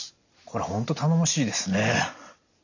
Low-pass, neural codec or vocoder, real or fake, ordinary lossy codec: 7.2 kHz; none; real; none